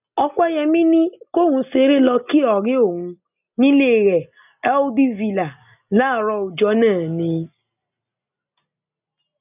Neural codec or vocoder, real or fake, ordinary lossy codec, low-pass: none; real; none; 3.6 kHz